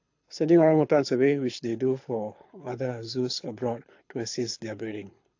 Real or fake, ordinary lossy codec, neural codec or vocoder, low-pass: fake; AAC, 48 kbps; codec, 24 kHz, 6 kbps, HILCodec; 7.2 kHz